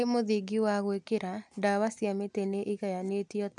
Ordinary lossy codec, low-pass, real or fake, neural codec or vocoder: none; 10.8 kHz; real; none